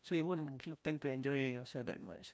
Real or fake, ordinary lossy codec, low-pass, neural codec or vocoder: fake; none; none; codec, 16 kHz, 1 kbps, FreqCodec, larger model